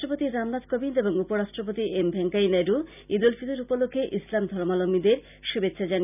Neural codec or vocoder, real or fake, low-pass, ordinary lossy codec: none; real; 3.6 kHz; none